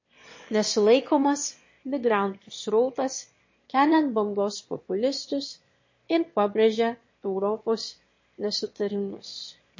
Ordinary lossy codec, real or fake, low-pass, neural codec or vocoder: MP3, 32 kbps; fake; 7.2 kHz; autoencoder, 22.05 kHz, a latent of 192 numbers a frame, VITS, trained on one speaker